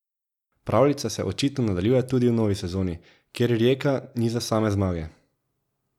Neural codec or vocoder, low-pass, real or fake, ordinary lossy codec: none; 19.8 kHz; real; none